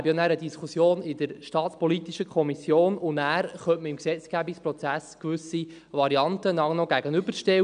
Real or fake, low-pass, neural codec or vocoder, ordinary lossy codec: real; 9.9 kHz; none; none